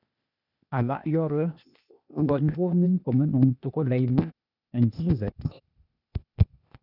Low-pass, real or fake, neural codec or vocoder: 5.4 kHz; fake; codec, 16 kHz, 0.8 kbps, ZipCodec